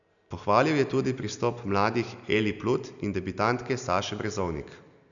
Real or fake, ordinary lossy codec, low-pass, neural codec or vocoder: real; none; 7.2 kHz; none